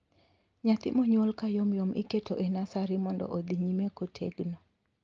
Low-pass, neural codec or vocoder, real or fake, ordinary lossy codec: 7.2 kHz; none; real; Opus, 24 kbps